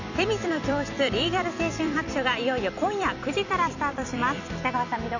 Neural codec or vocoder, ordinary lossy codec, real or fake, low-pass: vocoder, 44.1 kHz, 128 mel bands every 256 samples, BigVGAN v2; none; fake; 7.2 kHz